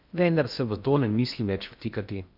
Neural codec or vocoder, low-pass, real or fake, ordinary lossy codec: codec, 16 kHz in and 24 kHz out, 0.6 kbps, FocalCodec, streaming, 2048 codes; 5.4 kHz; fake; none